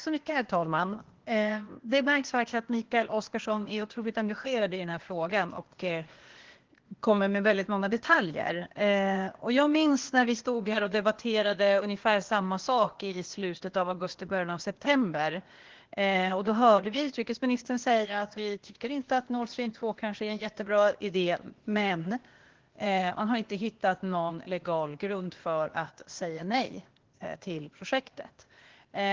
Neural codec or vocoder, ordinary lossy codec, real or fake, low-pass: codec, 16 kHz, 0.8 kbps, ZipCodec; Opus, 16 kbps; fake; 7.2 kHz